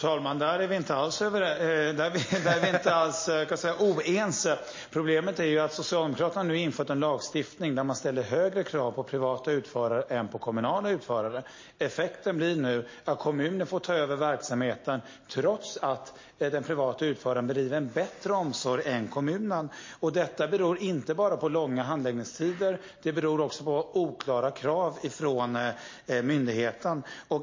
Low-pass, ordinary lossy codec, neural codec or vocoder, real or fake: 7.2 kHz; MP3, 32 kbps; none; real